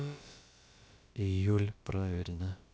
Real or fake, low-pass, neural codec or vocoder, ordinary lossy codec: fake; none; codec, 16 kHz, about 1 kbps, DyCAST, with the encoder's durations; none